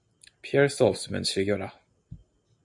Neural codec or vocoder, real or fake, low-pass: none; real; 10.8 kHz